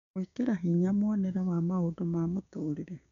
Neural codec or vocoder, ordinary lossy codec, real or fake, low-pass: codec, 16 kHz, 6 kbps, DAC; MP3, 64 kbps; fake; 7.2 kHz